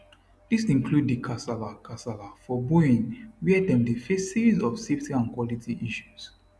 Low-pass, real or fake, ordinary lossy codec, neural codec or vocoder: none; real; none; none